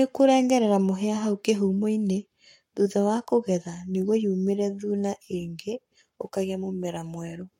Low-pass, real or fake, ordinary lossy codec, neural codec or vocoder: 19.8 kHz; fake; MP3, 64 kbps; codec, 44.1 kHz, 7.8 kbps, Pupu-Codec